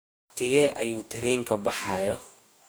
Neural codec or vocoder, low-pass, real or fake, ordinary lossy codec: codec, 44.1 kHz, 2.6 kbps, DAC; none; fake; none